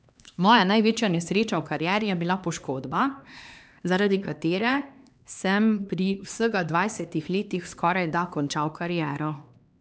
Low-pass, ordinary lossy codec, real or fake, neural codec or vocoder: none; none; fake; codec, 16 kHz, 2 kbps, X-Codec, HuBERT features, trained on LibriSpeech